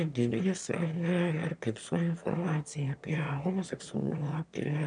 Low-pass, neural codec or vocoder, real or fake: 9.9 kHz; autoencoder, 22.05 kHz, a latent of 192 numbers a frame, VITS, trained on one speaker; fake